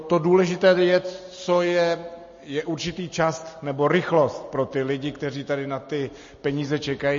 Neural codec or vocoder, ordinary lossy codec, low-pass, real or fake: none; MP3, 32 kbps; 7.2 kHz; real